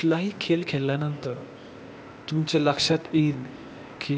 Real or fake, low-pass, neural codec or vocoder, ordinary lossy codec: fake; none; codec, 16 kHz, 0.8 kbps, ZipCodec; none